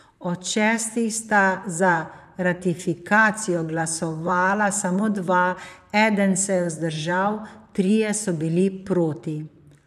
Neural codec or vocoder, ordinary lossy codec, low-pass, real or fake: vocoder, 44.1 kHz, 128 mel bands, Pupu-Vocoder; none; 14.4 kHz; fake